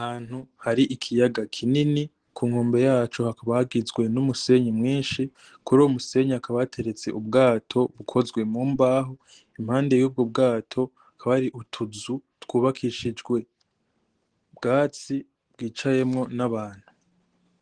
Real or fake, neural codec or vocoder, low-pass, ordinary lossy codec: real; none; 9.9 kHz; Opus, 16 kbps